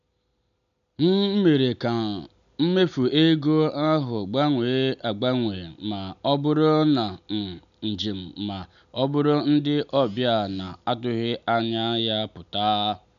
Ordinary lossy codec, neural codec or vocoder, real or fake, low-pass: none; none; real; 7.2 kHz